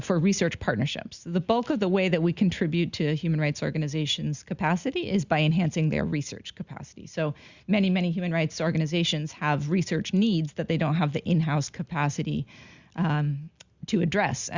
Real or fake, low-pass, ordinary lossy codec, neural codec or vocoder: real; 7.2 kHz; Opus, 64 kbps; none